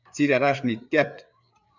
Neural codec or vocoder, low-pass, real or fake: codec, 16 kHz, 4 kbps, FreqCodec, larger model; 7.2 kHz; fake